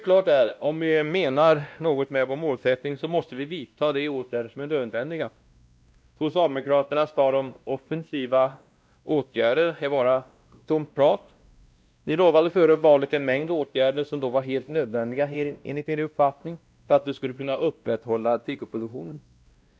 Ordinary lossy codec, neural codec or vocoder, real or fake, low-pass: none; codec, 16 kHz, 1 kbps, X-Codec, WavLM features, trained on Multilingual LibriSpeech; fake; none